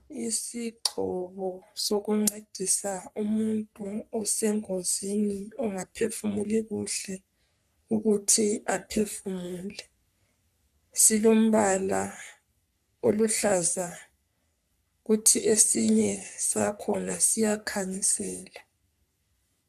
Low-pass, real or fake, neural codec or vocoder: 14.4 kHz; fake; codec, 44.1 kHz, 3.4 kbps, Pupu-Codec